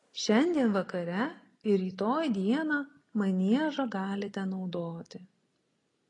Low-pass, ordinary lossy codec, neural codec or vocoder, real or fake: 10.8 kHz; AAC, 32 kbps; none; real